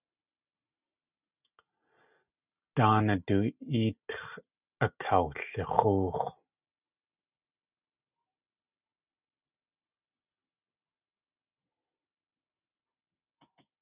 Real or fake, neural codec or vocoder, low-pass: real; none; 3.6 kHz